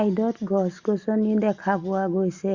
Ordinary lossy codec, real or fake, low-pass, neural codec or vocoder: Opus, 64 kbps; real; 7.2 kHz; none